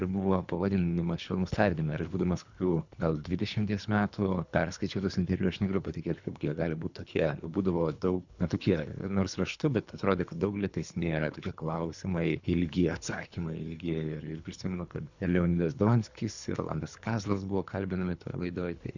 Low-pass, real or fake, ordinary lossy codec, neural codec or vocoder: 7.2 kHz; fake; Opus, 64 kbps; codec, 24 kHz, 3 kbps, HILCodec